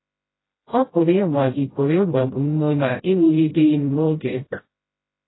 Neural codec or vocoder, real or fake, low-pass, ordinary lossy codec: codec, 16 kHz, 0.5 kbps, FreqCodec, smaller model; fake; 7.2 kHz; AAC, 16 kbps